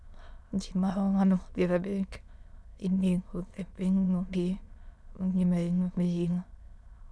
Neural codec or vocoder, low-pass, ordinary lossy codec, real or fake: autoencoder, 22.05 kHz, a latent of 192 numbers a frame, VITS, trained on many speakers; none; none; fake